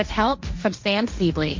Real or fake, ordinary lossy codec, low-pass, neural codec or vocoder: fake; MP3, 48 kbps; 7.2 kHz; codec, 16 kHz, 1.1 kbps, Voila-Tokenizer